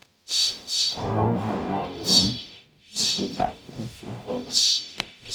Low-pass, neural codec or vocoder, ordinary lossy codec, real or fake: none; codec, 44.1 kHz, 0.9 kbps, DAC; none; fake